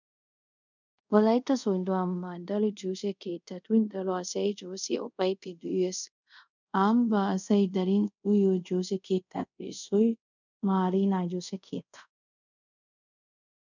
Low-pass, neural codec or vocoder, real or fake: 7.2 kHz; codec, 24 kHz, 0.5 kbps, DualCodec; fake